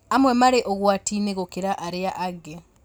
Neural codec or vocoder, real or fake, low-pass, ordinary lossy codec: none; real; none; none